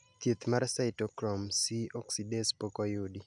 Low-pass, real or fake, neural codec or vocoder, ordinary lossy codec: 10.8 kHz; real; none; none